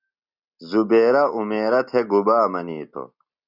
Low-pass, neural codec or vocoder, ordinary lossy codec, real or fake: 5.4 kHz; none; Opus, 64 kbps; real